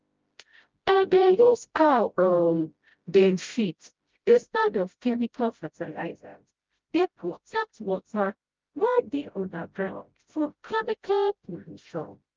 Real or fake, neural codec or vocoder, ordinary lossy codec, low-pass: fake; codec, 16 kHz, 0.5 kbps, FreqCodec, smaller model; Opus, 24 kbps; 7.2 kHz